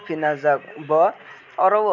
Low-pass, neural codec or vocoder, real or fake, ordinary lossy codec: 7.2 kHz; none; real; none